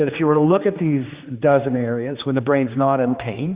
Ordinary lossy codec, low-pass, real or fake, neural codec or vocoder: Opus, 64 kbps; 3.6 kHz; fake; codec, 16 kHz, 2 kbps, X-Codec, HuBERT features, trained on general audio